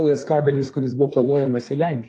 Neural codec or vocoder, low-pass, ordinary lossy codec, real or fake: codec, 24 kHz, 1 kbps, SNAC; 10.8 kHz; AAC, 48 kbps; fake